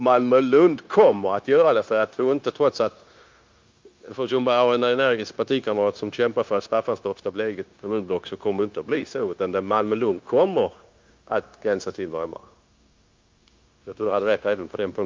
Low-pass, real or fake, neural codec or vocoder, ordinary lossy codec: 7.2 kHz; fake; codec, 16 kHz, 0.9 kbps, LongCat-Audio-Codec; Opus, 24 kbps